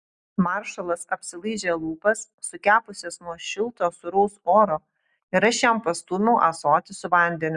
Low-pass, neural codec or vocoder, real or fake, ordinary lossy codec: 10.8 kHz; none; real; Opus, 64 kbps